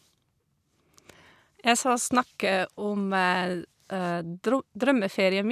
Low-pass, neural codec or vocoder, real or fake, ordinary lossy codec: 14.4 kHz; none; real; none